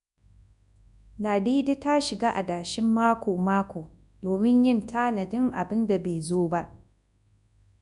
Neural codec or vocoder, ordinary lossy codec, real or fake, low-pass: codec, 24 kHz, 0.9 kbps, WavTokenizer, large speech release; none; fake; 10.8 kHz